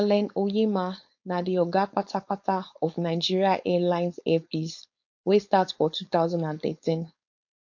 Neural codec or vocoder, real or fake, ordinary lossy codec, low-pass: codec, 16 kHz, 4.8 kbps, FACodec; fake; MP3, 48 kbps; 7.2 kHz